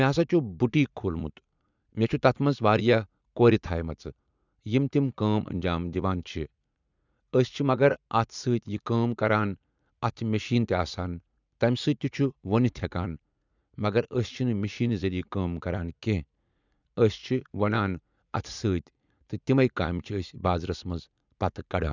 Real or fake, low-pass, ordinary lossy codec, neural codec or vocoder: fake; 7.2 kHz; none; vocoder, 44.1 kHz, 80 mel bands, Vocos